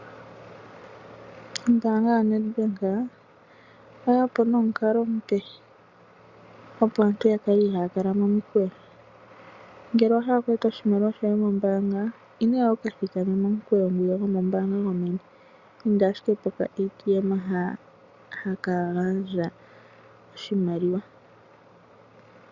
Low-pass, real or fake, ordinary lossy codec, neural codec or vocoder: 7.2 kHz; real; Opus, 64 kbps; none